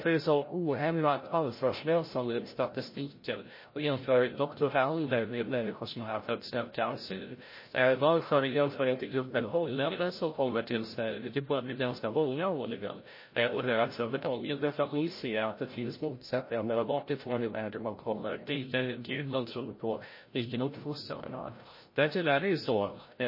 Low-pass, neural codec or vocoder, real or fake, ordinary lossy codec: 5.4 kHz; codec, 16 kHz, 0.5 kbps, FreqCodec, larger model; fake; MP3, 24 kbps